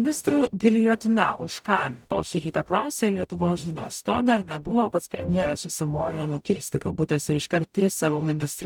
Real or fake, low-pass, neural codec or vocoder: fake; 19.8 kHz; codec, 44.1 kHz, 0.9 kbps, DAC